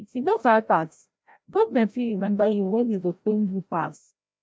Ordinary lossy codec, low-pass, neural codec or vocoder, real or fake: none; none; codec, 16 kHz, 0.5 kbps, FreqCodec, larger model; fake